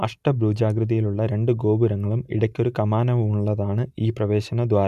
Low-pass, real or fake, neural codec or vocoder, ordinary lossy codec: 14.4 kHz; real; none; none